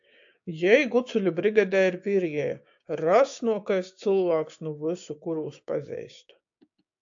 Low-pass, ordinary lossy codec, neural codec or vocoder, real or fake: 7.2 kHz; AAC, 64 kbps; codec, 16 kHz, 6 kbps, DAC; fake